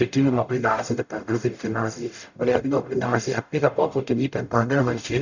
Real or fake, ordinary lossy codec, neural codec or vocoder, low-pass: fake; none; codec, 44.1 kHz, 0.9 kbps, DAC; 7.2 kHz